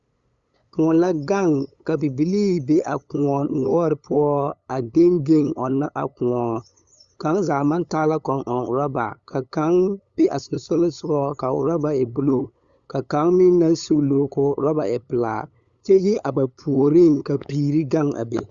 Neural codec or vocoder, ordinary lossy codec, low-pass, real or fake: codec, 16 kHz, 8 kbps, FunCodec, trained on LibriTTS, 25 frames a second; Opus, 64 kbps; 7.2 kHz; fake